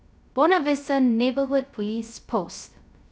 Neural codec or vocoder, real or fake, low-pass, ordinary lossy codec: codec, 16 kHz, 0.3 kbps, FocalCodec; fake; none; none